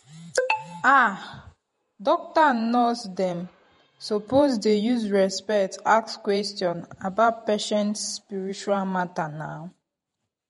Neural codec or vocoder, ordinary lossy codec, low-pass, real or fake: vocoder, 48 kHz, 128 mel bands, Vocos; MP3, 48 kbps; 19.8 kHz; fake